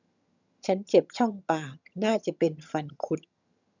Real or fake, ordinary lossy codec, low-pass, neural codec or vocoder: fake; none; 7.2 kHz; vocoder, 22.05 kHz, 80 mel bands, HiFi-GAN